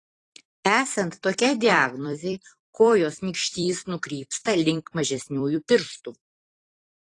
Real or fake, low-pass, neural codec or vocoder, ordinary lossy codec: fake; 10.8 kHz; vocoder, 44.1 kHz, 128 mel bands every 256 samples, BigVGAN v2; AAC, 32 kbps